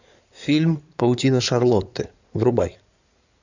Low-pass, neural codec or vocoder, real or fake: 7.2 kHz; codec, 16 kHz in and 24 kHz out, 2.2 kbps, FireRedTTS-2 codec; fake